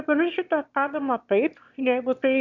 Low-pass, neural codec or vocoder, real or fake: 7.2 kHz; autoencoder, 22.05 kHz, a latent of 192 numbers a frame, VITS, trained on one speaker; fake